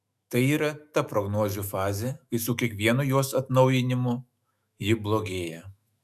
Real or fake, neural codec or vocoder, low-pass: fake; autoencoder, 48 kHz, 128 numbers a frame, DAC-VAE, trained on Japanese speech; 14.4 kHz